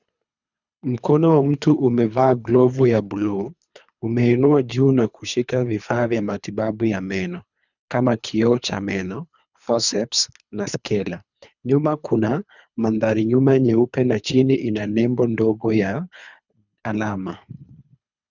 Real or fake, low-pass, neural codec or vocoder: fake; 7.2 kHz; codec, 24 kHz, 3 kbps, HILCodec